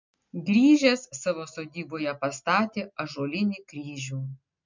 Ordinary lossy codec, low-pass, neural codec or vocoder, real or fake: MP3, 64 kbps; 7.2 kHz; none; real